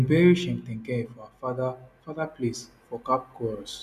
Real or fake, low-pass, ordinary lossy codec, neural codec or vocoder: real; 14.4 kHz; none; none